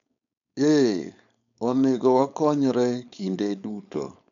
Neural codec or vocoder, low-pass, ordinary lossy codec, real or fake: codec, 16 kHz, 4.8 kbps, FACodec; 7.2 kHz; none; fake